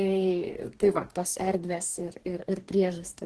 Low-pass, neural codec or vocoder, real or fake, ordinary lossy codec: 10.8 kHz; codec, 44.1 kHz, 2.6 kbps, DAC; fake; Opus, 16 kbps